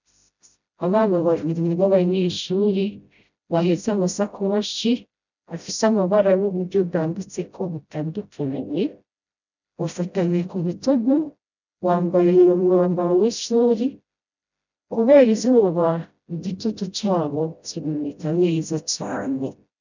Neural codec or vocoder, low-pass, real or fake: codec, 16 kHz, 0.5 kbps, FreqCodec, smaller model; 7.2 kHz; fake